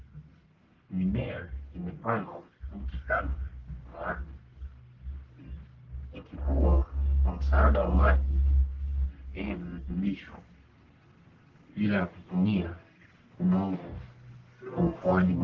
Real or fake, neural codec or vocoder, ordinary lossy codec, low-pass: fake; codec, 44.1 kHz, 1.7 kbps, Pupu-Codec; Opus, 16 kbps; 7.2 kHz